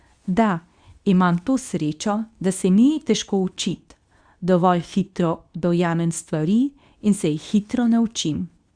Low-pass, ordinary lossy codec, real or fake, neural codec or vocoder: 9.9 kHz; Opus, 64 kbps; fake; codec, 24 kHz, 0.9 kbps, WavTokenizer, medium speech release version 2